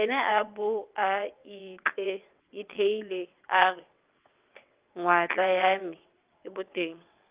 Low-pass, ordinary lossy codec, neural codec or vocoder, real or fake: 3.6 kHz; Opus, 32 kbps; vocoder, 22.05 kHz, 80 mel bands, WaveNeXt; fake